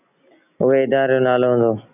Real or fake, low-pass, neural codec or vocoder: real; 3.6 kHz; none